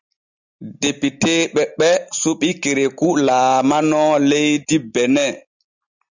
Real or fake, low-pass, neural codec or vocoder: real; 7.2 kHz; none